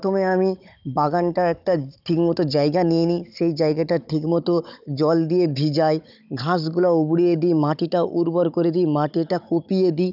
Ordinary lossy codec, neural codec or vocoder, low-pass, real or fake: none; codec, 16 kHz, 16 kbps, FunCodec, trained on Chinese and English, 50 frames a second; 5.4 kHz; fake